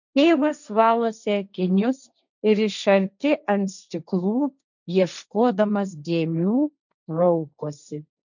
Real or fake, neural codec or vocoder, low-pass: fake; codec, 16 kHz, 1.1 kbps, Voila-Tokenizer; 7.2 kHz